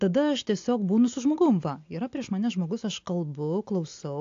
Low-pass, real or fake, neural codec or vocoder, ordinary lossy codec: 7.2 kHz; real; none; AAC, 48 kbps